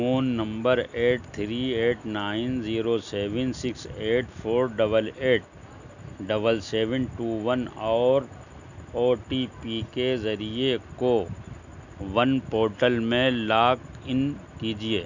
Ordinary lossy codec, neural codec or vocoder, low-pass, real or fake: none; none; 7.2 kHz; real